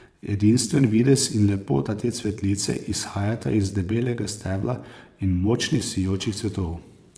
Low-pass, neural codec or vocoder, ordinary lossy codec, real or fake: none; vocoder, 22.05 kHz, 80 mel bands, WaveNeXt; none; fake